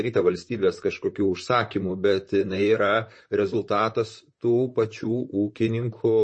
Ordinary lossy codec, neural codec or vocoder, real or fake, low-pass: MP3, 32 kbps; vocoder, 44.1 kHz, 128 mel bands, Pupu-Vocoder; fake; 9.9 kHz